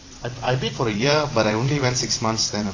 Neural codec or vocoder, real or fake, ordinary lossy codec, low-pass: vocoder, 22.05 kHz, 80 mel bands, WaveNeXt; fake; AAC, 32 kbps; 7.2 kHz